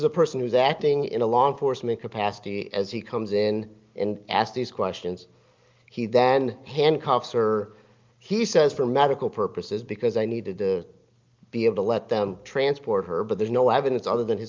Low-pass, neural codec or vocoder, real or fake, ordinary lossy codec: 7.2 kHz; none; real; Opus, 24 kbps